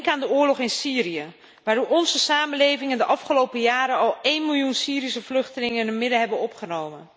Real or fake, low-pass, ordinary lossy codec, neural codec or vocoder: real; none; none; none